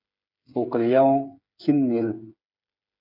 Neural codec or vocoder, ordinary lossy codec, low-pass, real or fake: codec, 16 kHz, 8 kbps, FreqCodec, smaller model; MP3, 48 kbps; 5.4 kHz; fake